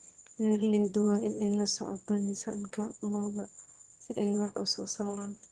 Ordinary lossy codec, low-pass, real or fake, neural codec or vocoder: Opus, 16 kbps; 9.9 kHz; fake; autoencoder, 22.05 kHz, a latent of 192 numbers a frame, VITS, trained on one speaker